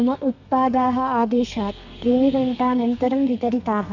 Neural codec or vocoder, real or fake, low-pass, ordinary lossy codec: codec, 32 kHz, 1.9 kbps, SNAC; fake; 7.2 kHz; none